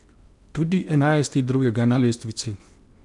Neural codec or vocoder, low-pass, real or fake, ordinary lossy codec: codec, 16 kHz in and 24 kHz out, 0.8 kbps, FocalCodec, streaming, 65536 codes; 10.8 kHz; fake; none